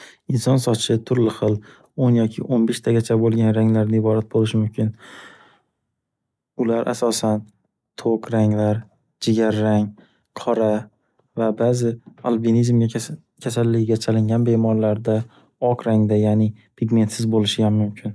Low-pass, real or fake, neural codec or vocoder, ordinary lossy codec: none; real; none; none